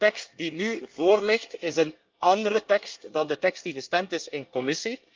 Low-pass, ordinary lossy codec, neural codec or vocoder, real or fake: 7.2 kHz; Opus, 32 kbps; codec, 24 kHz, 1 kbps, SNAC; fake